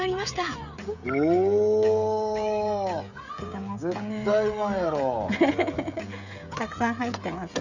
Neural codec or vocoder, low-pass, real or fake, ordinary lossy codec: codec, 16 kHz, 16 kbps, FreqCodec, smaller model; 7.2 kHz; fake; none